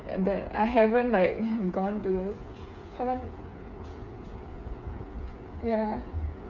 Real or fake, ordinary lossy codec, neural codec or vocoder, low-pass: fake; none; codec, 16 kHz, 8 kbps, FreqCodec, smaller model; 7.2 kHz